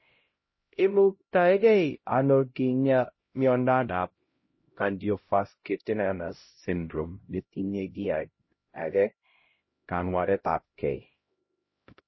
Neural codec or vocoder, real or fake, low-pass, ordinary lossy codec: codec, 16 kHz, 0.5 kbps, X-Codec, HuBERT features, trained on LibriSpeech; fake; 7.2 kHz; MP3, 24 kbps